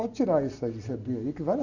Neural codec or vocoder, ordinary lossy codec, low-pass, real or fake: none; none; 7.2 kHz; real